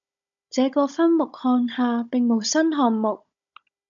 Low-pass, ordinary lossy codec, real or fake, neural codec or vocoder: 7.2 kHz; MP3, 96 kbps; fake; codec, 16 kHz, 16 kbps, FunCodec, trained on Chinese and English, 50 frames a second